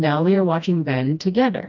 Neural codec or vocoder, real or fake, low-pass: codec, 16 kHz, 1 kbps, FreqCodec, smaller model; fake; 7.2 kHz